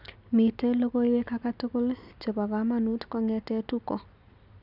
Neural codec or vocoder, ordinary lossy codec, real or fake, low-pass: none; none; real; 5.4 kHz